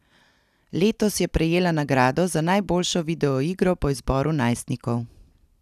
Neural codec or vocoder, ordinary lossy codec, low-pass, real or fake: none; none; 14.4 kHz; real